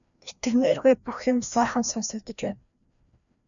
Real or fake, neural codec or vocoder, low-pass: fake; codec, 16 kHz, 1 kbps, FreqCodec, larger model; 7.2 kHz